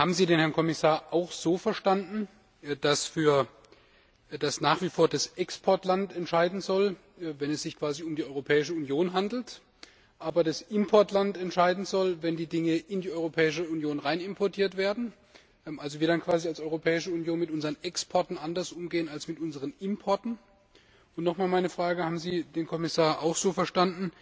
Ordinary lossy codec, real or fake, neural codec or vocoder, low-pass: none; real; none; none